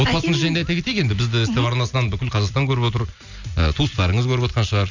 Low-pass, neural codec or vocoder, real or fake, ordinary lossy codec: 7.2 kHz; none; real; none